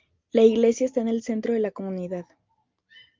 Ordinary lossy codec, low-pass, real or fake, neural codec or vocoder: Opus, 24 kbps; 7.2 kHz; real; none